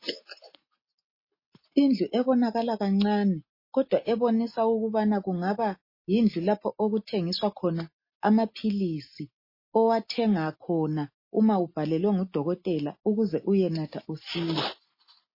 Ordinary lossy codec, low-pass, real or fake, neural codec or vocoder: MP3, 24 kbps; 5.4 kHz; real; none